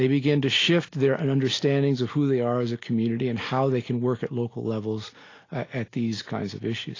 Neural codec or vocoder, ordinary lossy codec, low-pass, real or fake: none; AAC, 32 kbps; 7.2 kHz; real